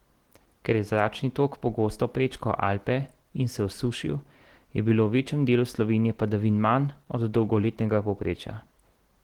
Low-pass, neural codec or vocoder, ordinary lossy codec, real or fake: 19.8 kHz; none; Opus, 16 kbps; real